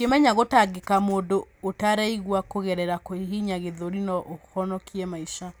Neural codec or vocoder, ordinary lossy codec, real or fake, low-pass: none; none; real; none